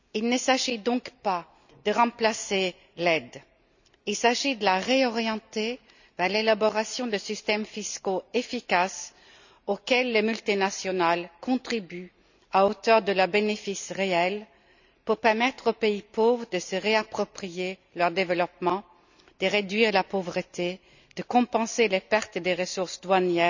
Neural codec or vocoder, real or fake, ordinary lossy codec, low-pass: none; real; none; 7.2 kHz